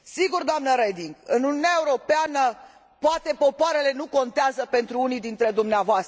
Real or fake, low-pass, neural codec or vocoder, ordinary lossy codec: real; none; none; none